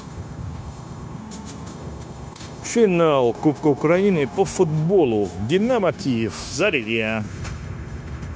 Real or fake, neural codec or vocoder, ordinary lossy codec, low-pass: fake; codec, 16 kHz, 0.9 kbps, LongCat-Audio-Codec; none; none